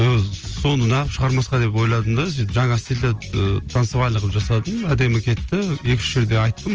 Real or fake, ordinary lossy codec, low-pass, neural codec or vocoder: real; Opus, 24 kbps; 7.2 kHz; none